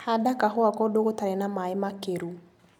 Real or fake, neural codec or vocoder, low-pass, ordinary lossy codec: real; none; 19.8 kHz; none